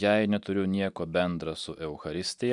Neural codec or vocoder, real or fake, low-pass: none; real; 10.8 kHz